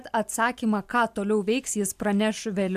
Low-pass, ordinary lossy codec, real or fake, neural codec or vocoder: 14.4 kHz; AAC, 96 kbps; real; none